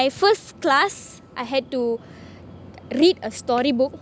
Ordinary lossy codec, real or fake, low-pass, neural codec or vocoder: none; real; none; none